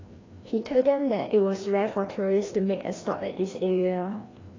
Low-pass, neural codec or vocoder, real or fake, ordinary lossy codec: 7.2 kHz; codec, 16 kHz, 1 kbps, FreqCodec, larger model; fake; AAC, 32 kbps